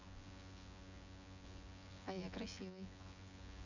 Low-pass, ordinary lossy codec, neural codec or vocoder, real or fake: 7.2 kHz; none; vocoder, 24 kHz, 100 mel bands, Vocos; fake